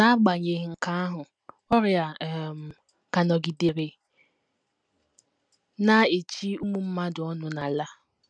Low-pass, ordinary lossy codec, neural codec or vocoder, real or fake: 9.9 kHz; none; none; real